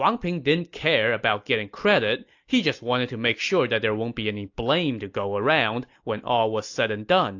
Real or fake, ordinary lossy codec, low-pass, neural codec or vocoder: real; AAC, 48 kbps; 7.2 kHz; none